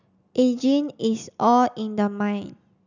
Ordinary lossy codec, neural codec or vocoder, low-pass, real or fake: none; none; 7.2 kHz; real